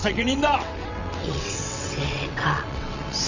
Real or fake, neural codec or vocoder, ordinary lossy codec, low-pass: fake; vocoder, 22.05 kHz, 80 mel bands, WaveNeXt; none; 7.2 kHz